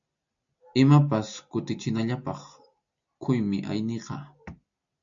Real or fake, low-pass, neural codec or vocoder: real; 7.2 kHz; none